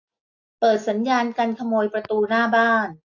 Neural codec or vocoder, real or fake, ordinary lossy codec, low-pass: none; real; AAC, 48 kbps; 7.2 kHz